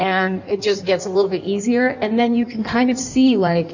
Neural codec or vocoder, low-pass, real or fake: codec, 16 kHz in and 24 kHz out, 1.1 kbps, FireRedTTS-2 codec; 7.2 kHz; fake